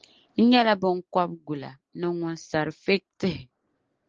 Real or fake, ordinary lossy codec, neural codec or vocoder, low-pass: real; Opus, 16 kbps; none; 7.2 kHz